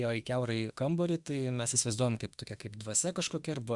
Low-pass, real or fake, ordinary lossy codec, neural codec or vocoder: 10.8 kHz; fake; Opus, 64 kbps; autoencoder, 48 kHz, 32 numbers a frame, DAC-VAE, trained on Japanese speech